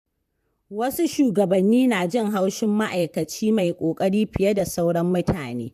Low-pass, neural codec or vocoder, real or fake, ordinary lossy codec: 14.4 kHz; vocoder, 44.1 kHz, 128 mel bands, Pupu-Vocoder; fake; MP3, 96 kbps